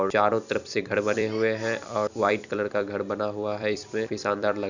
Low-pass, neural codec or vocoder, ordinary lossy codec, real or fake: 7.2 kHz; vocoder, 44.1 kHz, 128 mel bands every 256 samples, BigVGAN v2; none; fake